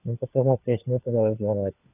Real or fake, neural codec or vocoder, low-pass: fake; codec, 16 kHz, 4 kbps, FunCodec, trained on LibriTTS, 50 frames a second; 3.6 kHz